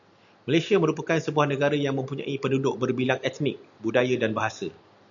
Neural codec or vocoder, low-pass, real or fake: none; 7.2 kHz; real